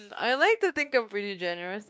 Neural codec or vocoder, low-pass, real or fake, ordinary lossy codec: codec, 16 kHz, 2 kbps, X-Codec, WavLM features, trained on Multilingual LibriSpeech; none; fake; none